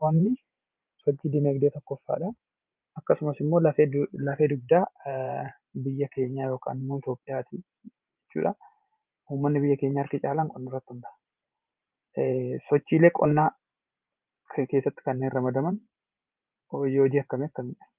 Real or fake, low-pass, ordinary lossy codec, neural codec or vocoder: fake; 3.6 kHz; Opus, 24 kbps; vocoder, 44.1 kHz, 128 mel bands every 512 samples, BigVGAN v2